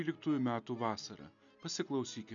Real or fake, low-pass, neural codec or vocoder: real; 7.2 kHz; none